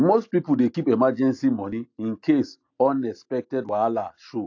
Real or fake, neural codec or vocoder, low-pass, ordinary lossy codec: real; none; 7.2 kHz; AAC, 48 kbps